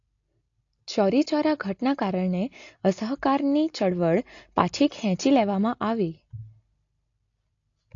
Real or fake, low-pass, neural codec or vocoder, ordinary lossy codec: real; 7.2 kHz; none; AAC, 48 kbps